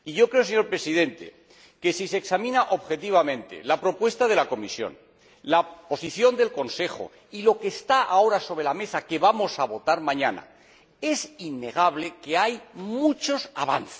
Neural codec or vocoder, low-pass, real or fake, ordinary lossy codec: none; none; real; none